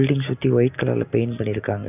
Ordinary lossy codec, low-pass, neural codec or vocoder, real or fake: none; 3.6 kHz; none; real